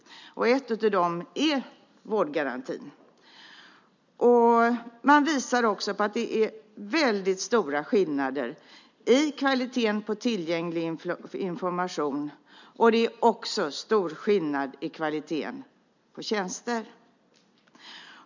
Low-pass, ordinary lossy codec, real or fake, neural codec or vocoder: 7.2 kHz; none; real; none